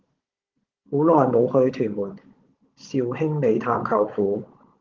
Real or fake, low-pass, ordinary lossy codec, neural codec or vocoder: fake; 7.2 kHz; Opus, 16 kbps; codec, 16 kHz, 16 kbps, FunCodec, trained on Chinese and English, 50 frames a second